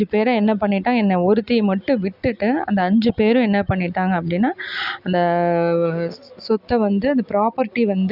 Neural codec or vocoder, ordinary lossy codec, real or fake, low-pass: none; none; real; 5.4 kHz